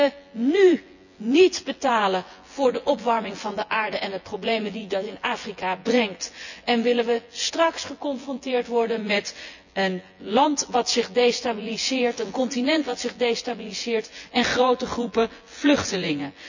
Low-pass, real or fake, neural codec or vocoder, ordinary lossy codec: 7.2 kHz; fake; vocoder, 24 kHz, 100 mel bands, Vocos; none